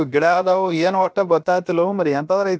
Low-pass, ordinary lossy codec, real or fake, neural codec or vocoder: none; none; fake; codec, 16 kHz, 0.7 kbps, FocalCodec